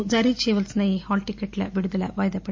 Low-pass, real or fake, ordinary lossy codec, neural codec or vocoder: 7.2 kHz; real; MP3, 48 kbps; none